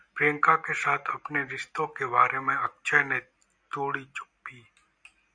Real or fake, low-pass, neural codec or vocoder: real; 9.9 kHz; none